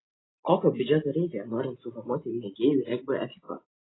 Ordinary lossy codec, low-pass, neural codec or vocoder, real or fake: AAC, 16 kbps; 7.2 kHz; none; real